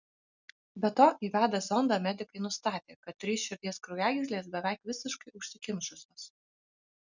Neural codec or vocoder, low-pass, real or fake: none; 7.2 kHz; real